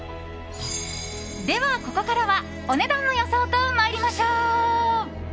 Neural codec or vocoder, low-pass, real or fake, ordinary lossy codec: none; none; real; none